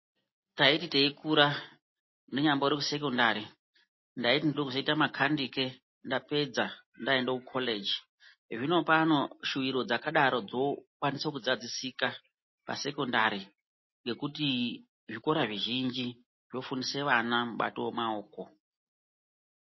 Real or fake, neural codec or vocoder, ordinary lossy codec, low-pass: real; none; MP3, 24 kbps; 7.2 kHz